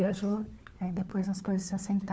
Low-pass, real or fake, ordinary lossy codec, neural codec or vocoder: none; fake; none; codec, 16 kHz, 4 kbps, FunCodec, trained on LibriTTS, 50 frames a second